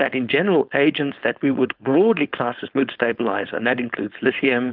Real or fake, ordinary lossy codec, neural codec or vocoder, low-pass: fake; Opus, 32 kbps; codec, 16 kHz, 4.8 kbps, FACodec; 5.4 kHz